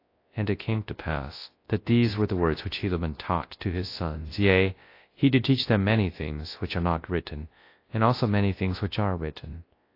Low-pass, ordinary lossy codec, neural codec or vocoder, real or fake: 5.4 kHz; AAC, 32 kbps; codec, 24 kHz, 0.9 kbps, WavTokenizer, large speech release; fake